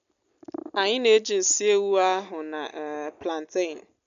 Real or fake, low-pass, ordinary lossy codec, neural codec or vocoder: real; 7.2 kHz; none; none